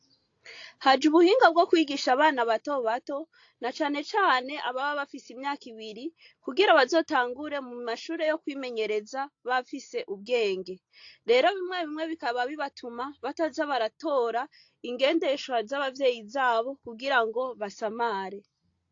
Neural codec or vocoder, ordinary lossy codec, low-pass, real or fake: none; AAC, 48 kbps; 7.2 kHz; real